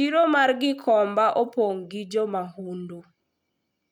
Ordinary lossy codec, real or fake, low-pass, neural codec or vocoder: none; real; 19.8 kHz; none